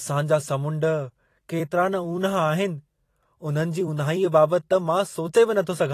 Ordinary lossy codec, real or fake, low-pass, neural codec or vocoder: AAC, 48 kbps; fake; 14.4 kHz; vocoder, 44.1 kHz, 128 mel bands every 256 samples, BigVGAN v2